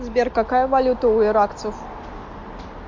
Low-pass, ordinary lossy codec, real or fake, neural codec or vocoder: 7.2 kHz; MP3, 48 kbps; real; none